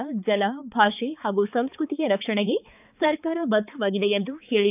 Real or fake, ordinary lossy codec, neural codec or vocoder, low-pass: fake; none; codec, 16 kHz, 4 kbps, X-Codec, HuBERT features, trained on balanced general audio; 3.6 kHz